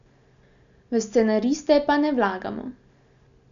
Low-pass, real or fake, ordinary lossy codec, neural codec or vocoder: 7.2 kHz; real; none; none